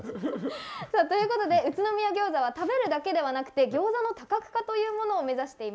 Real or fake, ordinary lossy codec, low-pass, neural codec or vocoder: real; none; none; none